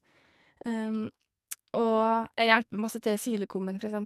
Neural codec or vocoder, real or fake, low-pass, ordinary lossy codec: codec, 44.1 kHz, 2.6 kbps, SNAC; fake; 14.4 kHz; none